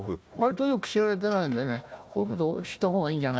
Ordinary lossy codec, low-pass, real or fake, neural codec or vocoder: none; none; fake; codec, 16 kHz, 1 kbps, FunCodec, trained on Chinese and English, 50 frames a second